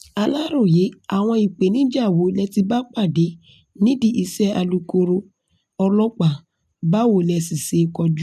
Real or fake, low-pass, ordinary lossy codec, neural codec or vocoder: real; 14.4 kHz; none; none